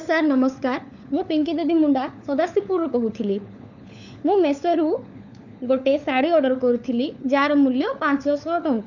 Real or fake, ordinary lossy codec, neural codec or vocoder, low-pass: fake; none; codec, 16 kHz, 4 kbps, FunCodec, trained on LibriTTS, 50 frames a second; 7.2 kHz